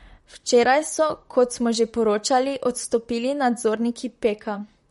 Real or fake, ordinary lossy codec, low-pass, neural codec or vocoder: fake; MP3, 48 kbps; 19.8 kHz; vocoder, 44.1 kHz, 128 mel bands, Pupu-Vocoder